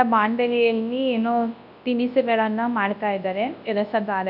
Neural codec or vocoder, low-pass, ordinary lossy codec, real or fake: codec, 24 kHz, 0.9 kbps, WavTokenizer, large speech release; 5.4 kHz; none; fake